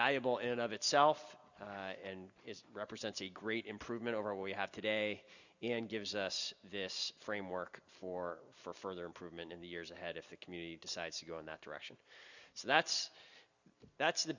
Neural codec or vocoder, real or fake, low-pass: none; real; 7.2 kHz